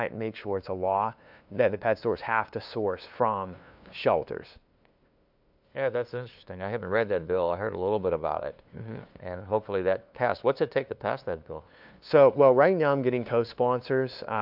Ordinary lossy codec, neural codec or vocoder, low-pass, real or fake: AAC, 48 kbps; codec, 16 kHz, 2 kbps, FunCodec, trained on LibriTTS, 25 frames a second; 5.4 kHz; fake